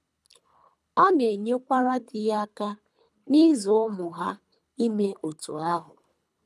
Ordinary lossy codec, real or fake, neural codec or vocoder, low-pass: none; fake; codec, 24 kHz, 3 kbps, HILCodec; none